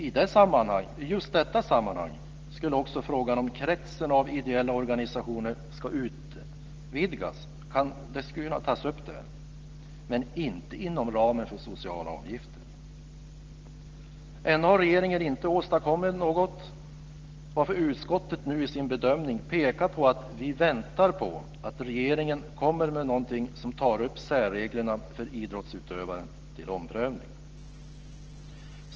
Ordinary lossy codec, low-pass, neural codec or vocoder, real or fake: Opus, 16 kbps; 7.2 kHz; none; real